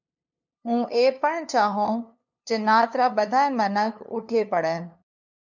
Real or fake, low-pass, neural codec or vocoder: fake; 7.2 kHz; codec, 16 kHz, 2 kbps, FunCodec, trained on LibriTTS, 25 frames a second